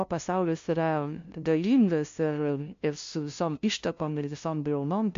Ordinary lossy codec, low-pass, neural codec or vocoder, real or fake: MP3, 48 kbps; 7.2 kHz; codec, 16 kHz, 0.5 kbps, FunCodec, trained on LibriTTS, 25 frames a second; fake